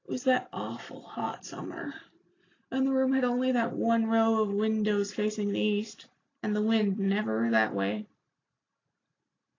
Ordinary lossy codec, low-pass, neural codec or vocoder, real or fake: AAC, 32 kbps; 7.2 kHz; none; real